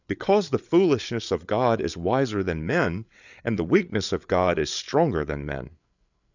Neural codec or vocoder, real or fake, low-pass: codec, 16 kHz, 8 kbps, FreqCodec, larger model; fake; 7.2 kHz